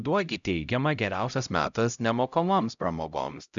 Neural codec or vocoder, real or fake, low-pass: codec, 16 kHz, 0.5 kbps, X-Codec, HuBERT features, trained on LibriSpeech; fake; 7.2 kHz